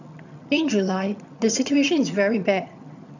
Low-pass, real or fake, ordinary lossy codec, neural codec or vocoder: 7.2 kHz; fake; none; vocoder, 22.05 kHz, 80 mel bands, HiFi-GAN